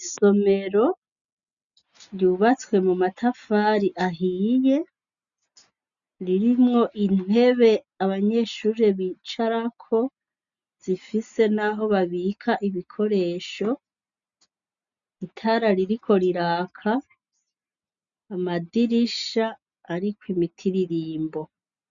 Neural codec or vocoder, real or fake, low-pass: none; real; 7.2 kHz